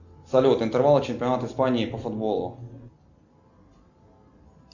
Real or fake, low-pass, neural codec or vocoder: real; 7.2 kHz; none